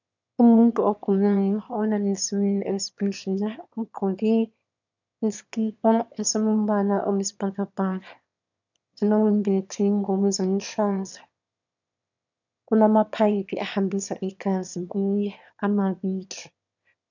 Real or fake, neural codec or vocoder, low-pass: fake; autoencoder, 22.05 kHz, a latent of 192 numbers a frame, VITS, trained on one speaker; 7.2 kHz